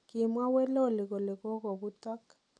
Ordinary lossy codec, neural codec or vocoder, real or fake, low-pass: none; none; real; 9.9 kHz